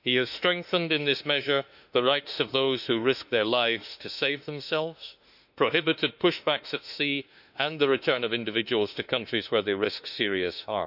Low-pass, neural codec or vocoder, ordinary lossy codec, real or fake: 5.4 kHz; autoencoder, 48 kHz, 32 numbers a frame, DAC-VAE, trained on Japanese speech; none; fake